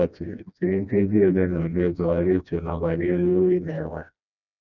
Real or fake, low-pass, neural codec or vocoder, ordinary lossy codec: fake; 7.2 kHz; codec, 16 kHz, 1 kbps, FreqCodec, smaller model; none